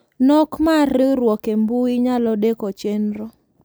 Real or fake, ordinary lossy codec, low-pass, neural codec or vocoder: real; none; none; none